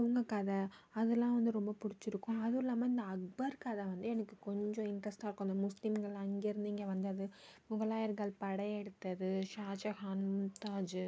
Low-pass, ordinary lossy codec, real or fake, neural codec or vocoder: none; none; real; none